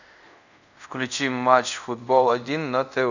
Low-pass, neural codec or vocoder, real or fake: 7.2 kHz; codec, 24 kHz, 0.5 kbps, DualCodec; fake